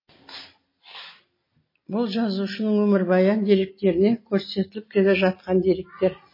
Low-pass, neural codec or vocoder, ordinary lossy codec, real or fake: 5.4 kHz; none; MP3, 24 kbps; real